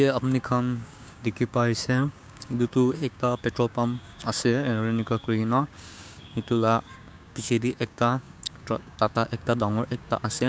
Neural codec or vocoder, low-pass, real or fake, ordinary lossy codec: codec, 16 kHz, 6 kbps, DAC; none; fake; none